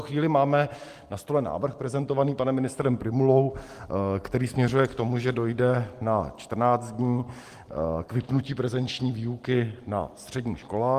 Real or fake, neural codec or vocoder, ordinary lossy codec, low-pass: real; none; Opus, 24 kbps; 14.4 kHz